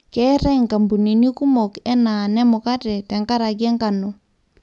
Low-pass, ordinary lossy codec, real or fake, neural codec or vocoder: 10.8 kHz; none; real; none